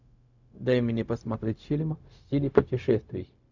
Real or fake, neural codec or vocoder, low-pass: fake; codec, 16 kHz, 0.4 kbps, LongCat-Audio-Codec; 7.2 kHz